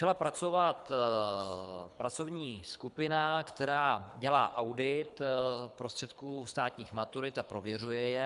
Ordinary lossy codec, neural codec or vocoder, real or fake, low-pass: MP3, 96 kbps; codec, 24 kHz, 3 kbps, HILCodec; fake; 10.8 kHz